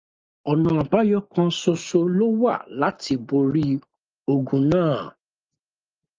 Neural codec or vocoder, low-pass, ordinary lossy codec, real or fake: none; 7.2 kHz; Opus, 32 kbps; real